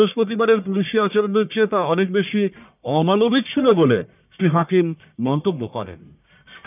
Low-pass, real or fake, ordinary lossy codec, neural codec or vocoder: 3.6 kHz; fake; none; codec, 44.1 kHz, 1.7 kbps, Pupu-Codec